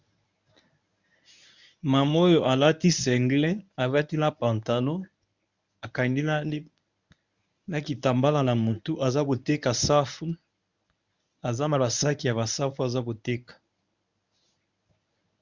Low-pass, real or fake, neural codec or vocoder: 7.2 kHz; fake; codec, 24 kHz, 0.9 kbps, WavTokenizer, medium speech release version 1